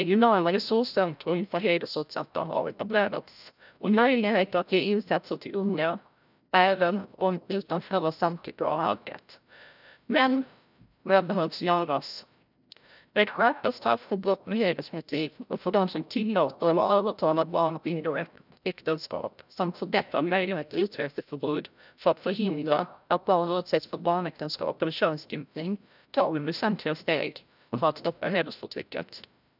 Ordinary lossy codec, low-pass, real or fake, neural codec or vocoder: none; 5.4 kHz; fake; codec, 16 kHz, 0.5 kbps, FreqCodec, larger model